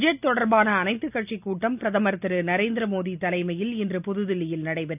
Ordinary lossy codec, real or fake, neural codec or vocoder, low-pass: none; real; none; 3.6 kHz